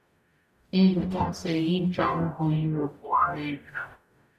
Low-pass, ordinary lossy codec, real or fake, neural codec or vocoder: 14.4 kHz; none; fake; codec, 44.1 kHz, 0.9 kbps, DAC